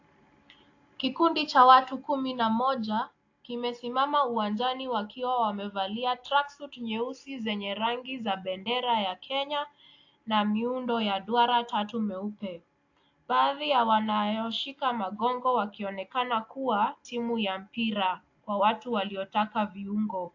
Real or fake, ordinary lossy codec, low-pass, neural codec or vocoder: real; AAC, 48 kbps; 7.2 kHz; none